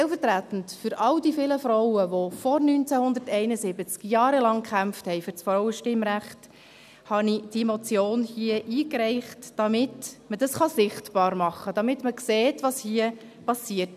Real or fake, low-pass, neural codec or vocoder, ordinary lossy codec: real; 14.4 kHz; none; none